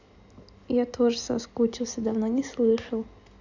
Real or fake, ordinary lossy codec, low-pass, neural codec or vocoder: real; none; 7.2 kHz; none